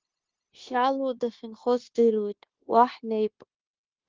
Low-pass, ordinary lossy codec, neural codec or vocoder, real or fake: 7.2 kHz; Opus, 16 kbps; codec, 16 kHz, 0.9 kbps, LongCat-Audio-Codec; fake